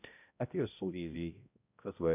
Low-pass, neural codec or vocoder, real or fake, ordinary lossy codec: 3.6 kHz; codec, 16 kHz, 0.5 kbps, X-Codec, HuBERT features, trained on balanced general audio; fake; none